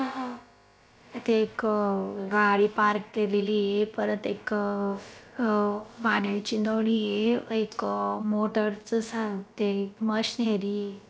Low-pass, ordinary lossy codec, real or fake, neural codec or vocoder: none; none; fake; codec, 16 kHz, about 1 kbps, DyCAST, with the encoder's durations